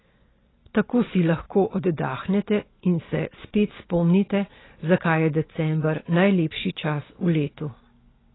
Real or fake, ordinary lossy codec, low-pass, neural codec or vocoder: real; AAC, 16 kbps; 7.2 kHz; none